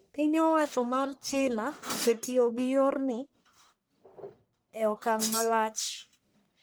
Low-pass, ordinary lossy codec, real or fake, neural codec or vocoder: none; none; fake; codec, 44.1 kHz, 1.7 kbps, Pupu-Codec